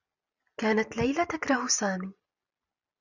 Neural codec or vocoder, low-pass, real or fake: none; 7.2 kHz; real